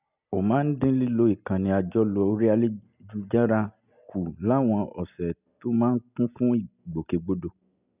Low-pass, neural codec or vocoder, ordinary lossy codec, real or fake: 3.6 kHz; none; none; real